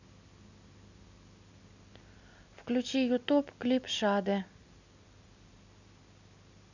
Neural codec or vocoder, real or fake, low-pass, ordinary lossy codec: none; real; 7.2 kHz; none